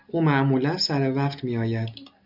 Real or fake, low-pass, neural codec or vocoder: real; 5.4 kHz; none